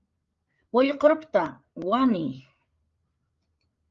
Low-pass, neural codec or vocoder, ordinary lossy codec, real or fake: 7.2 kHz; codec, 16 kHz, 8 kbps, FreqCodec, larger model; Opus, 32 kbps; fake